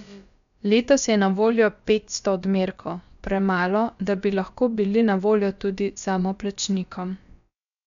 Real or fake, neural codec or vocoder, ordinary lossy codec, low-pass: fake; codec, 16 kHz, about 1 kbps, DyCAST, with the encoder's durations; none; 7.2 kHz